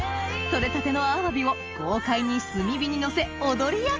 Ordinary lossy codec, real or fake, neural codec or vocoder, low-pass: Opus, 24 kbps; real; none; 7.2 kHz